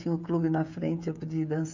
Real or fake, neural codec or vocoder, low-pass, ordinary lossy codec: fake; codec, 16 kHz, 16 kbps, FreqCodec, smaller model; 7.2 kHz; none